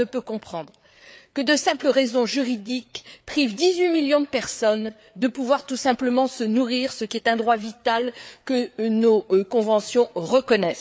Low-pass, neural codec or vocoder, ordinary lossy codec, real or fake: none; codec, 16 kHz, 4 kbps, FreqCodec, larger model; none; fake